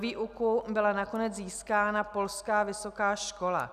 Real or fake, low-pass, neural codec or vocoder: real; 14.4 kHz; none